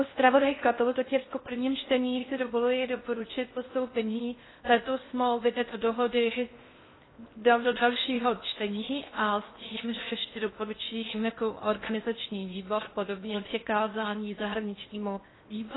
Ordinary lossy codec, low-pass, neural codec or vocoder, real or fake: AAC, 16 kbps; 7.2 kHz; codec, 16 kHz in and 24 kHz out, 0.6 kbps, FocalCodec, streaming, 4096 codes; fake